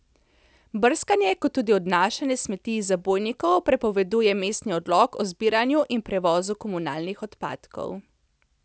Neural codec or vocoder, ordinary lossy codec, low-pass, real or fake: none; none; none; real